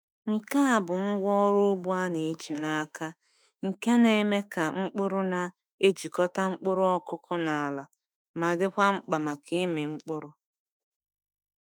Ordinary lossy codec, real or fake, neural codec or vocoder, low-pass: none; fake; autoencoder, 48 kHz, 32 numbers a frame, DAC-VAE, trained on Japanese speech; none